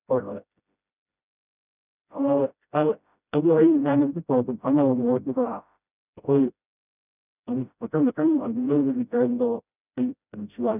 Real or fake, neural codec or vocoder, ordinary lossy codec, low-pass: fake; codec, 16 kHz, 0.5 kbps, FreqCodec, smaller model; none; 3.6 kHz